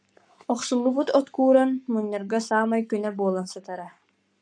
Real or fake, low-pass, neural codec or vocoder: fake; 9.9 kHz; codec, 44.1 kHz, 7.8 kbps, Pupu-Codec